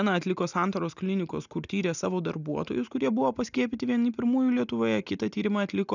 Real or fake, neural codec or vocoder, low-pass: real; none; 7.2 kHz